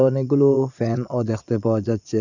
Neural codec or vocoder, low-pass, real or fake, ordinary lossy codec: vocoder, 22.05 kHz, 80 mel bands, Vocos; 7.2 kHz; fake; none